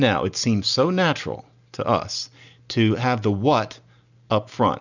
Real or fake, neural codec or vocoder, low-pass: real; none; 7.2 kHz